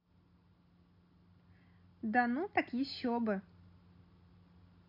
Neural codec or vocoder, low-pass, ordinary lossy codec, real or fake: none; 5.4 kHz; none; real